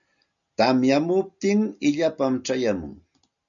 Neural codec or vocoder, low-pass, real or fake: none; 7.2 kHz; real